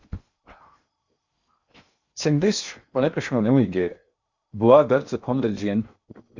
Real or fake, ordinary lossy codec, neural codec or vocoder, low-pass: fake; Opus, 64 kbps; codec, 16 kHz in and 24 kHz out, 0.8 kbps, FocalCodec, streaming, 65536 codes; 7.2 kHz